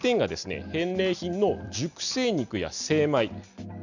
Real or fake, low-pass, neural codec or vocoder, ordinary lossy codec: real; 7.2 kHz; none; none